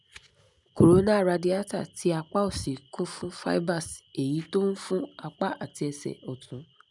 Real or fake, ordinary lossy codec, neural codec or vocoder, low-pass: real; none; none; 10.8 kHz